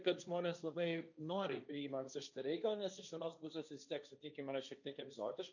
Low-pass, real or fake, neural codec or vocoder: 7.2 kHz; fake; codec, 16 kHz, 1.1 kbps, Voila-Tokenizer